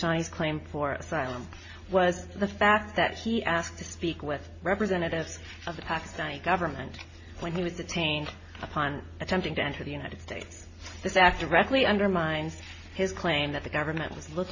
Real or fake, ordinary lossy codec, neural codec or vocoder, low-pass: real; MP3, 32 kbps; none; 7.2 kHz